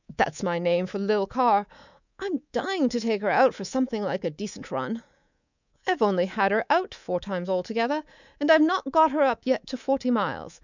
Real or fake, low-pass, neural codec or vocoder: fake; 7.2 kHz; codec, 24 kHz, 3.1 kbps, DualCodec